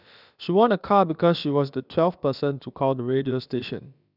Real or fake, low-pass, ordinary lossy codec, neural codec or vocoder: fake; 5.4 kHz; none; codec, 16 kHz, about 1 kbps, DyCAST, with the encoder's durations